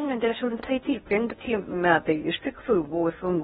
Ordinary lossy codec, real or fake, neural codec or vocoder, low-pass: AAC, 16 kbps; fake; codec, 16 kHz in and 24 kHz out, 0.6 kbps, FocalCodec, streaming, 4096 codes; 10.8 kHz